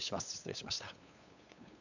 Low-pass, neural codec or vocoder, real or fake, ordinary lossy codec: 7.2 kHz; codec, 16 kHz, 8 kbps, FunCodec, trained on LibriTTS, 25 frames a second; fake; none